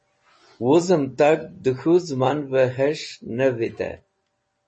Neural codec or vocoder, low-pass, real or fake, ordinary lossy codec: none; 10.8 kHz; real; MP3, 32 kbps